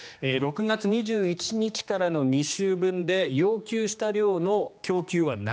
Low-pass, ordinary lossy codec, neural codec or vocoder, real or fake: none; none; codec, 16 kHz, 2 kbps, X-Codec, HuBERT features, trained on general audio; fake